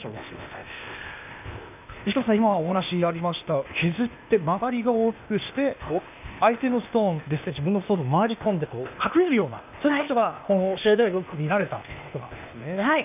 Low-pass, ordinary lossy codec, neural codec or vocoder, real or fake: 3.6 kHz; none; codec, 16 kHz, 0.8 kbps, ZipCodec; fake